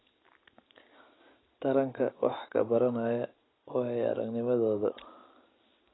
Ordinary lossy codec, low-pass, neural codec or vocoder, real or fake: AAC, 16 kbps; 7.2 kHz; none; real